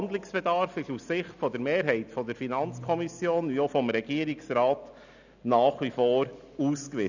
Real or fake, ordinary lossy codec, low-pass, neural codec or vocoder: real; none; 7.2 kHz; none